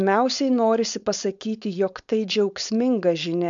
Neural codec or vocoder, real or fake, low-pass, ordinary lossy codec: codec, 16 kHz, 4.8 kbps, FACodec; fake; 7.2 kHz; MP3, 64 kbps